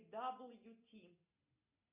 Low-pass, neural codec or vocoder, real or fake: 3.6 kHz; none; real